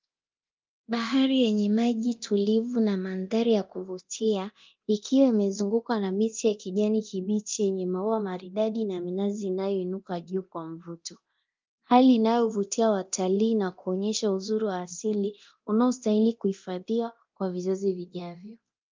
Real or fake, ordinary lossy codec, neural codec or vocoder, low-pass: fake; Opus, 24 kbps; codec, 24 kHz, 0.9 kbps, DualCodec; 7.2 kHz